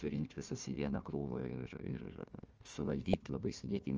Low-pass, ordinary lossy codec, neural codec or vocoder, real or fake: 7.2 kHz; Opus, 24 kbps; codec, 16 kHz, 1 kbps, FunCodec, trained on Chinese and English, 50 frames a second; fake